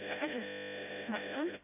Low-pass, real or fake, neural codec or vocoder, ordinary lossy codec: 3.6 kHz; fake; codec, 16 kHz, 0.5 kbps, FreqCodec, smaller model; none